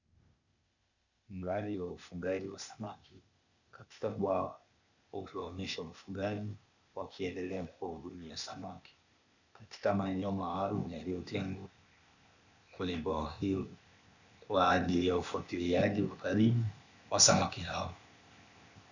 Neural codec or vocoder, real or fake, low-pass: codec, 16 kHz, 0.8 kbps, ZipCodec; fake; 7.2 kHz